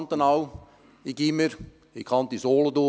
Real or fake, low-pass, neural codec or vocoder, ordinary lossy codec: real; none; none; none